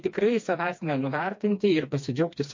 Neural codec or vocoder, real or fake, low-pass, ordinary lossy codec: codec, 16 kHz, 2 kbps, FreqCodec, smaller model; fake; 7.2 kHz; MP3, 48 kbps